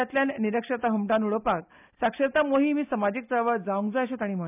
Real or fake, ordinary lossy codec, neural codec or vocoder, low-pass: real; none; none; 3.6 kHz